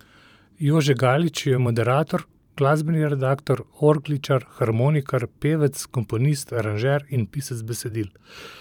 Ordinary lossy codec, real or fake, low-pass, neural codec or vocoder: none; fake; 19.8 kHz; vocoder, 44.1 kHz, 128 mel bands every 512 samples, BigVGAN v2